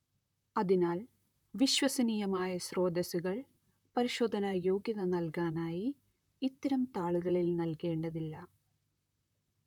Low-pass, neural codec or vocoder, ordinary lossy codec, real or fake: 19.8 kHz; vocoder, 44.1 kHz, 128 mel bands, Pupu-Vocoder; none; fake